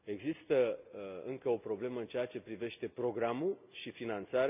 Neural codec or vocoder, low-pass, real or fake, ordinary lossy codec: none; 3.6 kHz; real; none